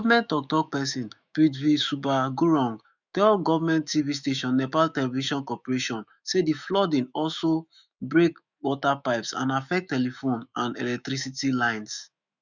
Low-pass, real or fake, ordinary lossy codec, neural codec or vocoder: 7.2 kHz; fake; none; autoencoder, 48 kHz, 128 numbers a frame, DAC-VAE, trained on Japanese speech